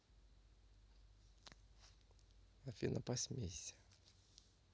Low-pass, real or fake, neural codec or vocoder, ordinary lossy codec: none; real; none; none